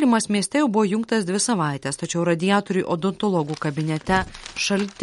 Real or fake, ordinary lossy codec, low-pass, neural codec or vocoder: real; MP3, 48 kbps; 19.8 kHz; none